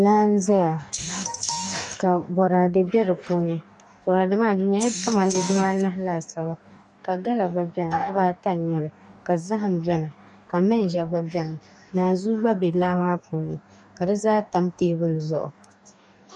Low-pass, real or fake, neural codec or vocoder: 10.8 kHz; fake; codec, 44.1 kHz, 2.6 kbps, DAC